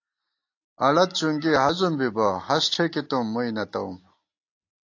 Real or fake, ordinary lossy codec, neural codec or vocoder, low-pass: real; AAC, 48 kbps; none; 7.2 kHz